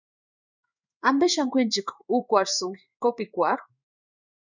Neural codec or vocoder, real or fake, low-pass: codec, 16 kHz in and 24 kHz out, 1 kbps, XY-Tokenizer; fake; 7.2 kHz